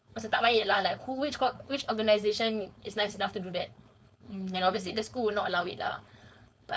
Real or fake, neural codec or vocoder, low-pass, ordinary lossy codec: fake; codec, 16 kHz, 4.8 kbps, FACodec; none; none